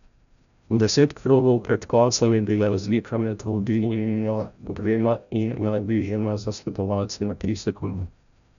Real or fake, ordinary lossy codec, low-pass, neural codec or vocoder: fake; none; 7.2 kHz; codec, 16 kHz, 0.5 kbps, FreqCodec, larger model